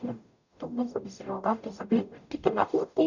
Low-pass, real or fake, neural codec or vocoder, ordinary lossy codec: 7.2 kHz; fake; codec, 44.1 kHz, 0.9 kbps, DAC; none